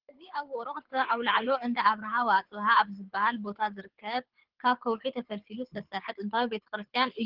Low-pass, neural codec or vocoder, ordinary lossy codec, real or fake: 5.4 kHz; codec, 24 kHz, 6 kbps, HILCodec; Opus, 16 kbps; fake